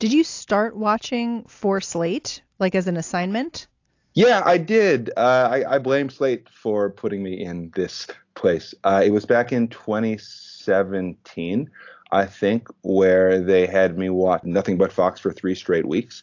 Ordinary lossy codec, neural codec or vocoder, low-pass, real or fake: AAC, 48 kbps; none; 7.2 kHz; real